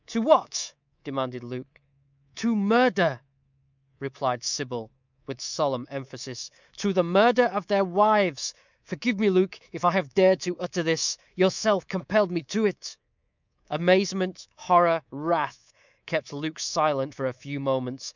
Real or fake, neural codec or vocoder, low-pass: fake; codec, 24 kHz, 3.1 kbps, DualCodec; 7.2 kHz